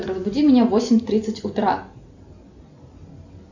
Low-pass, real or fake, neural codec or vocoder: 7.2 kHz; real; none